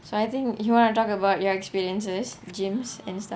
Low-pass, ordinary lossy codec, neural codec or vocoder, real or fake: none; none; none; real